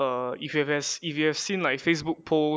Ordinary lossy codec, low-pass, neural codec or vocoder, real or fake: none; none; none; real